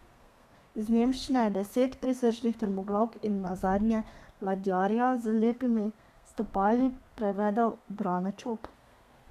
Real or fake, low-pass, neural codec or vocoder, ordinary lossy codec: fake; 14.4 kHz; codec, 32 kHz, 1.9 kbps, SNAC; none